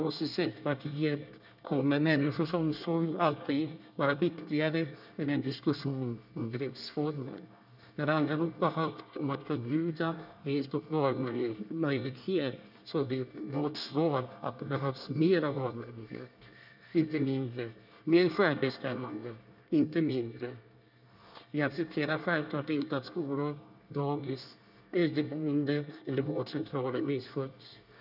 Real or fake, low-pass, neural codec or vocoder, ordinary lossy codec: fake; 5.4 kHz; codec, 24 kHz, 1 kbps, SNAC; none